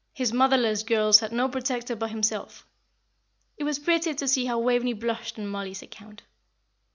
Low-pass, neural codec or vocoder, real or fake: 7.2 kHz; none; real